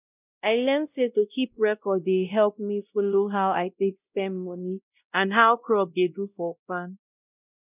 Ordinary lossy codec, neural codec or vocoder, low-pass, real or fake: none; codec, 16 kHz, 0.5 kbps, X-Codec, WavLM features, trained on Multilingual LibriSpeech; 3.6 kHz; fake